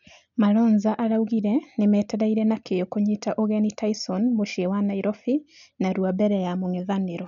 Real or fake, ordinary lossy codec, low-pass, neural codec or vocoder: real; none; 7.2 kHz; none